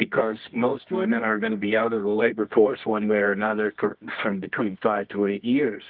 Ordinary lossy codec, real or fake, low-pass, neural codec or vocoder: Opus, 24 kbps; fake; 5.4 kHz; codec, 24 kHz, 0.9 kbps, WavTokenizer, medium music audio release